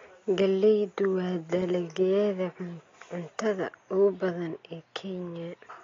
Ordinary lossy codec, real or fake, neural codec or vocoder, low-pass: AAC, 32 kbps; real; none; 7.2 kHz